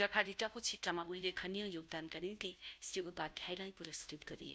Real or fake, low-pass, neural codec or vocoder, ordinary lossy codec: fake; none; codec, 16 kHz, 0.5 kbps, FunCodec, trained on Chinese and English, 25 frames a second; none